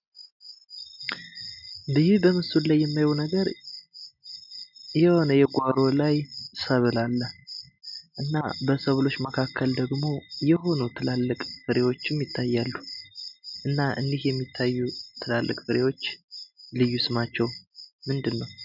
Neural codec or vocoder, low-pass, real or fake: none; 5.4 kHz; real